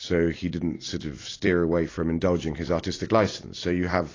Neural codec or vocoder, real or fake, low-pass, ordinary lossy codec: vocoder, 44.1 kHz, 128 mel bands every 256 samples, BigVGAN v2; fake; 7.2 kHz; AAC, 32 kbps